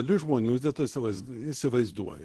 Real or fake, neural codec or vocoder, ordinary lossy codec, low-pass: fake; codec, 24 kHz, 0.9 kbps, WavTokenizer, small release; Opus, 16 kbps; 10.8 kHz